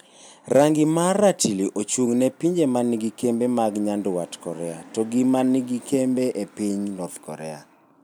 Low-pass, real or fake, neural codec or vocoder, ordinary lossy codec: none; real; none; none